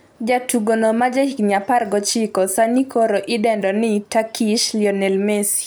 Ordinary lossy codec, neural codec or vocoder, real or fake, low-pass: none; none; real; none